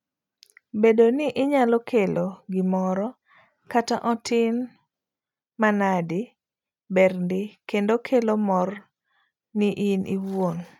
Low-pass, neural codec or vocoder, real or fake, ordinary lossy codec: 19.8 kHz; none; real; none